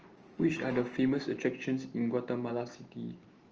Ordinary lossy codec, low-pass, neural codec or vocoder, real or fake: Opus, 24 kbps; 7.2 kHz; none; real